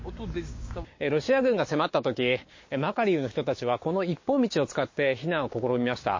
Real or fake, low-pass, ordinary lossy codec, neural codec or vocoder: fake; 7.2 kHz; MP3, 32 kbps; codec, 16 kHz, 6 kbps, DAC